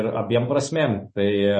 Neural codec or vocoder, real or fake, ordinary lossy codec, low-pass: none; real; MP3, 32 kbps; 10.8 kHz